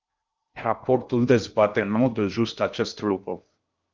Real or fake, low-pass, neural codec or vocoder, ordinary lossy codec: fake; 7.2 kHz; codec, 16 kHz in and 24 kHz out, 0.6 kbps, FocalCodec, streaming, 2048 codes; Opus, 24 kbps